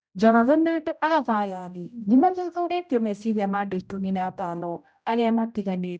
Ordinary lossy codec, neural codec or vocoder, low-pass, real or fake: none; codec, 16 kHz, 0.5 kbps, X-Codec, HuBERT features, trained on general audio; none; fake